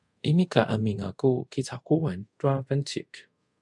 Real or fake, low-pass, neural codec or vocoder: fake; 10.8 kHz; codec, 24 kHz, 0.5 kbps, DualCodec